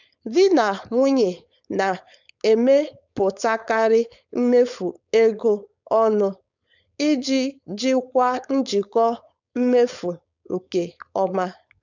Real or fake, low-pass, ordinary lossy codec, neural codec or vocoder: fake; 7.2 kHz; none; codec, 16 kHz, 4.8 kbps, FACodec